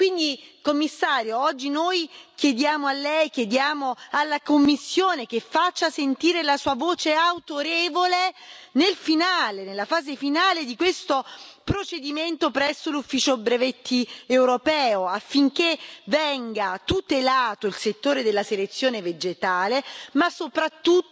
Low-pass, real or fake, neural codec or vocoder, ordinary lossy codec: none; real; none; none